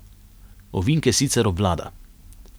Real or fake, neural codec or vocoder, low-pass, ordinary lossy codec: real; none; none; none